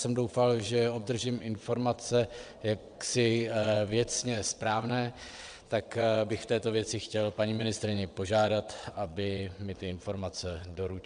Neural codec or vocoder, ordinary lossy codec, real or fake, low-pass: vocoder, 22.05 kHz, 80 mel bands, WaveNeXt; MP3, 96 kbps; fake; 9.9 kHz